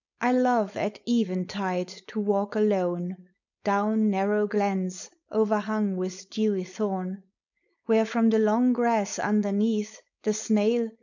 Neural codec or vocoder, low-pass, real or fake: codec, 16 kHz, 4.8 kbps, FACodec; 7.2 kHz; fake